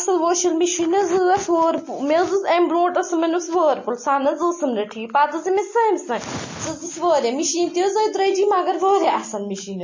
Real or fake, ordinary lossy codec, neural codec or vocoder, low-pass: real; MP3, 32 kbps; none; 7.2 kHz